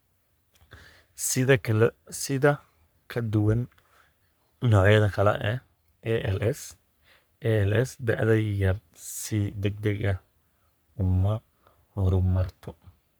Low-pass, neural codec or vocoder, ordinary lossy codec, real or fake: none; codec, 44.1 kHz, 3.4 kbps, Pupu-Codec; none; fake